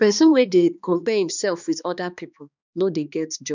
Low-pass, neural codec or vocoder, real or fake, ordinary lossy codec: 7.2 kHz; codec, 16 kHz, 2 kbps, X-Codec, HuBERT features, trained on balanced general audio; fake; none